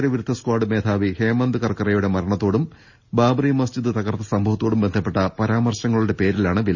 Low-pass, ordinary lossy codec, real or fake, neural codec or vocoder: 7.2 kHz; none; real; none